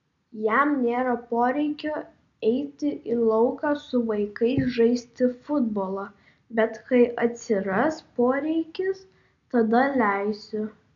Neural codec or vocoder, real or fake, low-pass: none; real; 7.2 kHz